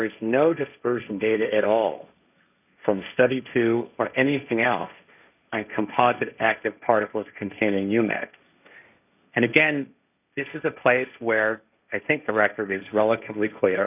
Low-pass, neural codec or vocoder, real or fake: 3.6 kHz; codec, 16 kHz, 1.1 kbps, Voila-Tokenizer; fake